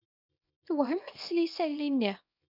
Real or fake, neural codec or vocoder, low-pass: fake; codec, 24 kHz, 0.9 kbps, WavTokenizer, small release; 5.4 kHz